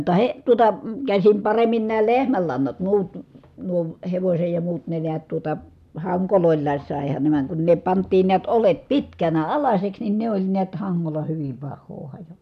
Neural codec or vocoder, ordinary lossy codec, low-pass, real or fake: none; none; 14.4 kHz; real